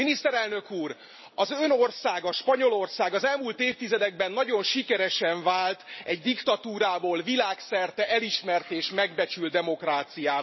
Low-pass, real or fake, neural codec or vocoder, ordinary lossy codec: 7.2 kHz; real; none; MP3, 24 kbps